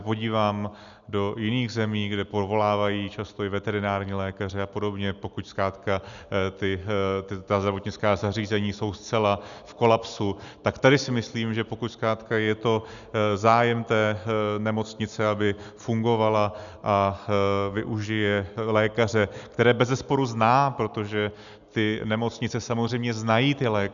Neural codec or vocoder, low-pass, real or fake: none; 7.2 kHz; real